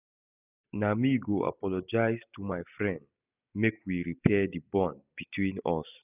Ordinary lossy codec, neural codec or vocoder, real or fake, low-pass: none; none; real; 3.6 kHz